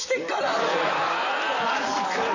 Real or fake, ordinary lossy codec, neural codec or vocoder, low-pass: fake; none; vocoder, 44.1 kHz, 128 mel bands, Pupu-Vocoder; 7.2 kHz